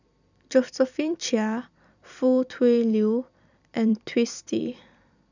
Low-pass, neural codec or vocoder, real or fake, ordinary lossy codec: 7.2 kHz; vocoder, 44.1 kHz, 128 mel bands every 256 samples, BigVGAN v2; fake; none